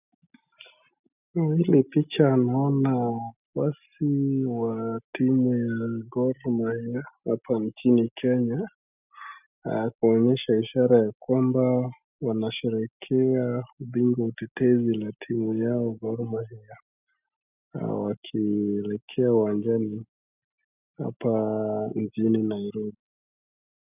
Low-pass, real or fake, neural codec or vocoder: 3.6 kHz; real; none